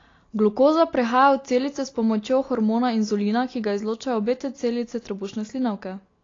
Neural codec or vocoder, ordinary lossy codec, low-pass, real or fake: none; AAC, 32 kbps; 7.2 kHz; real